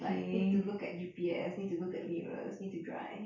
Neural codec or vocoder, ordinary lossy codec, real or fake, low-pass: none; MP3, 48 kbps; real; 7.2 kHz